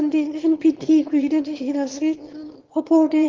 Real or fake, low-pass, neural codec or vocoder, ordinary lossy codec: fake; 7.2 kHz; autoencoder, 22.05 kHz, a latent of 192 numbers a frame, VITS, trained on one speaker; Opus, 16 kbps